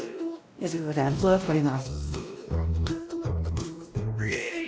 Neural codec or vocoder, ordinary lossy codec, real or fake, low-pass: codec, 16 kHz, 1 kbps, X-Codec, WavLM features, trained on Multilingual LibriSpeech; none; fake; none